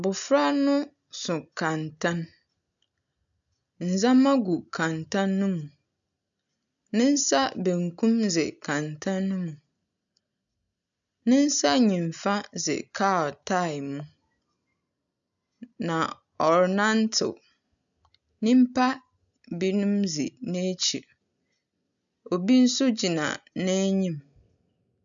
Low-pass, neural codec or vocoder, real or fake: 7.2 kHz; none; real